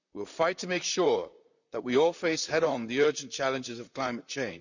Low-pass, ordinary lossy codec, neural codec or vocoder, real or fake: 7.2 kHz; none; vocoder, 44.1 kHz, 128 mel bands, Pupu-Vocoder; fake